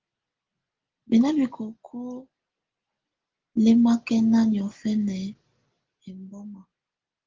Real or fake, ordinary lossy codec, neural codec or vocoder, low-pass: real; Opus, 16 kbps; none; 7.2 kHz